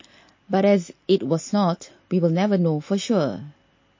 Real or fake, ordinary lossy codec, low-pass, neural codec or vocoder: real; MP3, 32 kbps; 7.2 kHz; none